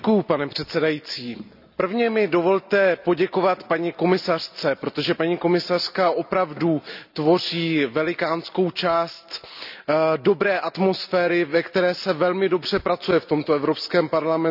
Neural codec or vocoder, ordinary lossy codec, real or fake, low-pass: none; none; real; 5.4 kHz